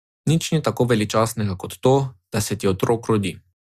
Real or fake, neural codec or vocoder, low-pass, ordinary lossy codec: real; none; 14.4 kHz; Opus, 64 kbps